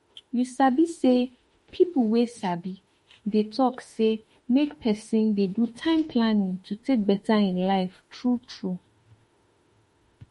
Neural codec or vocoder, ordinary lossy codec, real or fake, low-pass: autoencoder, 48 kHz, 32 numbers a frame, DAC-VAE, trained on Japanese speech; MP3, 48 kbps; fake; 19.8 kHz